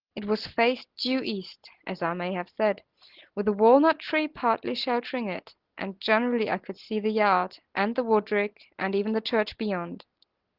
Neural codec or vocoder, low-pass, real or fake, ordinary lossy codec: none; 5.4 kHz; real; Opus, 16 kbps